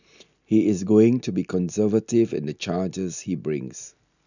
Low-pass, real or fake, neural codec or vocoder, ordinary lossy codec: 7.2 kHz; real; none; none